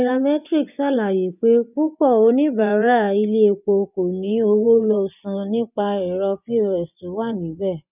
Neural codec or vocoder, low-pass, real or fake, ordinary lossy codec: vocoder, 24 kHz, 100 mel bands, Vocos; 3.6 kHz; fake; none